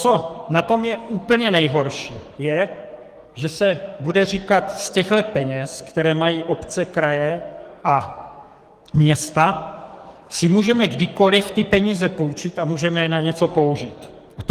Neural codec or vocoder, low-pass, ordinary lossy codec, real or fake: codec, 44.1 kHz, 2.6 kbps, SNAC; 14.4 kHz; Opus, 24 kbps; fake